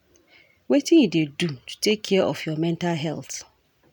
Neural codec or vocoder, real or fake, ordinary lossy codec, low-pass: none; real; none; none